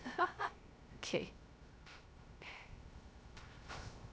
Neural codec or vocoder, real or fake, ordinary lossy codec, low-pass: codec, 16 kHz, 0.3 kbps, FocalCodec; fake; none; none